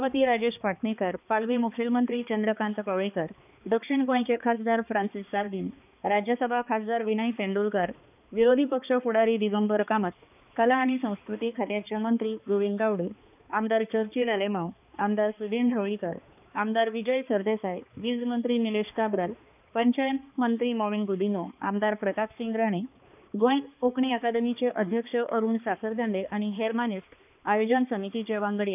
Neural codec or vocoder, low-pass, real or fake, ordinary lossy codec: codec, 16 kHz, 2 kbps, X-Codec, HuBERT features, trained on balanced general audio; 3.6 kHz; fake; none